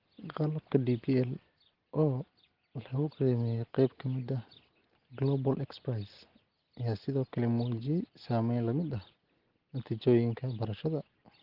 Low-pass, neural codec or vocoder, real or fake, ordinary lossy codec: 5.4 kHz; none; real; Opus, 16 kbps